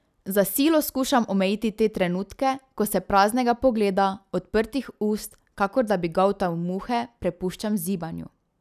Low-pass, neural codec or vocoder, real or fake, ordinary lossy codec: 14.4 kHz; none; real; none